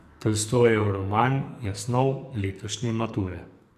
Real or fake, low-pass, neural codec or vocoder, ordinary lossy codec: fake; 14.4 kHz; codec, 44.1 kHz, 2.6 kbps, SNAC; none